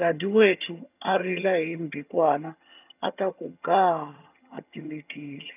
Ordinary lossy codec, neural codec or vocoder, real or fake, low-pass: none; vocoder, 22.05 kHz, 80 mel bands, HiFi-GAN; fake; 3.6 kHz